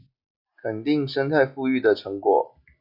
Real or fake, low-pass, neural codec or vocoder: real; 5.4 kHz; none